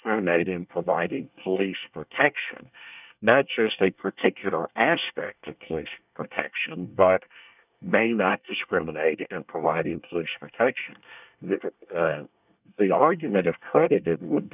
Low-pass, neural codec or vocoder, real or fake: 3.6 kHz; codec, 24 kHz, 1 kbps, SNAC; fake